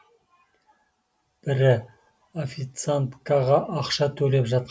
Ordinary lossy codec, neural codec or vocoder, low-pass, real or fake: none; none; none; real